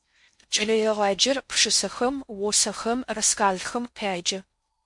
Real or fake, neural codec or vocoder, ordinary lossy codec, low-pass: fake; codec, 16 kHz in and 24 kHz out, 0.6 kbps, FocalCodec, streaming, 4096 codes; MP3, 64 kbps; 10.8 kHz